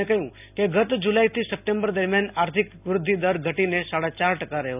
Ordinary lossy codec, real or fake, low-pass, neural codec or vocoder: none; real; 3.6 kHz; none